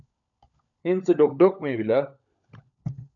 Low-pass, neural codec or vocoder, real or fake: 7.2 kHz; codec, 16 kHz, 16 kbps, FunCodec, trained on LibriTTS, 50 frames a second; fake